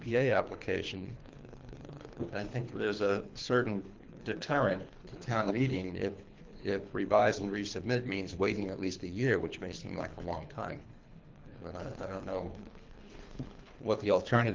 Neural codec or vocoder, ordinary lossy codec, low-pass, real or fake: codec, 24 kHz, 3 kbps, HILCodec; Opus, 32 kbps; 7.2 kHz; fake